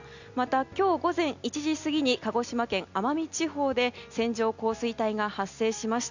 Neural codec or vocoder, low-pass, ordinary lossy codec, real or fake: none; 7.2 kHz; none; real